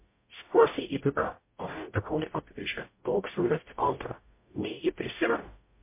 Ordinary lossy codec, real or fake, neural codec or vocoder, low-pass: MP3, 24 kbps; fake; codec, 44.1 kHz, 0.9 kbps, DAC; 3.6 kHz